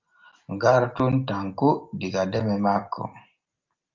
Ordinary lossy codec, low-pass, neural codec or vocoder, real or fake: Opus, 24 kbps; 7.2 kHz; none; real